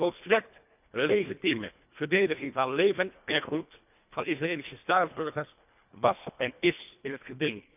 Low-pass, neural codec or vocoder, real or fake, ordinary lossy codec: 3.6 kHz; codec, 24 kHz, 1.5 kbps, HILCodec; fake; none